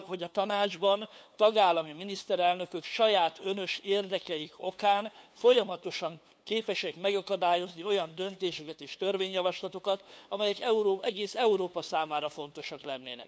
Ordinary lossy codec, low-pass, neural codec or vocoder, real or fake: none; none; codec, 16 kHz, 2 kbps, FunCodec, trained on LibriTTS, 25 frames a second; fake